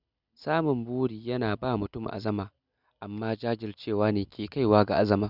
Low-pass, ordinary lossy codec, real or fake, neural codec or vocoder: 5.4 kHz; none; real; none